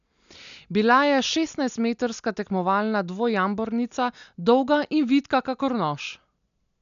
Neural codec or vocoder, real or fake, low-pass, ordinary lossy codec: none; real; 7.2 kHz; none